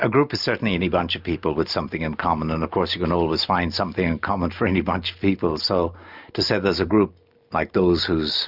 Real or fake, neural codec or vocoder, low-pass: real; none; 5.4 kHz